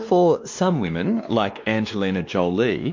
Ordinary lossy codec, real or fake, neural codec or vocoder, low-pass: MP3, 48 kbps; fake; codec, 16 kHz, 2 kbps, X-Codec, WavLM features, trained on Multilingual LibriSpeech; 7.2 kHz